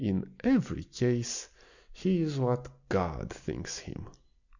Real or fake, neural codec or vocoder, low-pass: real; none; 7.2 kHz